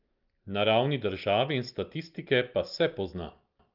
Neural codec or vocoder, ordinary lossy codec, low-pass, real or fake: none; Opus, 24 kbps; 5.4 kHz; real